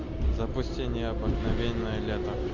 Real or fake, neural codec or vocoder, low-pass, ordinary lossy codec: real; none; 7.2 kHz; AAC, 48 kbps